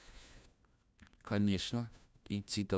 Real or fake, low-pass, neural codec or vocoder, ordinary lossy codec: fake; none; codec, 16 kHz, 1 kbps, FunCodec, trained on LibriTTS, 50 frames a second; none